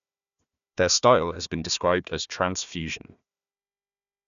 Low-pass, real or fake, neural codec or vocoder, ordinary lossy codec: 7.2 kHz; fake; codec, 16 kHz, 1 kbps, FunCodec, trained on Chinese and English, 50 frames a second; none